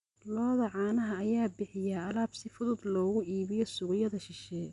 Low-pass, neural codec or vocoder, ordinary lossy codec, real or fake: 10.8 kHz; none; none; real